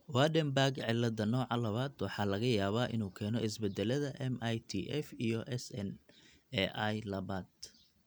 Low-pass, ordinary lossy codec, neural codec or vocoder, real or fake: none; none; none; real